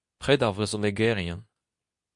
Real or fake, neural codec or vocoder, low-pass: fake; codec, 24 kHz, 0.9 kbps, WavTokenizer, medium speech release version 2; 10.8 kHz